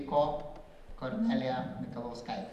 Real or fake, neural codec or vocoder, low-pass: fake; vocoder, 44.1 kHz, 128 mel bands every 512 samples, BigVGAN v2; 14.4 kHz